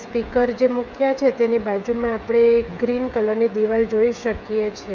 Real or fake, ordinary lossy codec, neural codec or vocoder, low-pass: fake; none; codec, 16 kHz, 8 kbps, FreqCodec, smaller model; 7.2 kHz